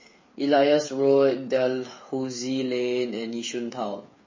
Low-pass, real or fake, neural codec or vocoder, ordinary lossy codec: 7.2 kHz; fake; codec, 16 kHz, 8 kbps, FreqCodec, smaller model; MP3, 32 kbps